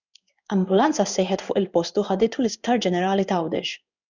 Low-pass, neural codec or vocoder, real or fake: 7.2 kHz; codec, 16 kHz in and 24 kHz out, 1 kbps, XY-Tokenizer; fake